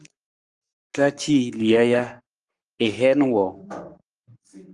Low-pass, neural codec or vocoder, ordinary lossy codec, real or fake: 10.8 kHz; codec, 44.1 kHz, 7.8 kbps, Pupu-Codec; Opus, 32 kbps; fake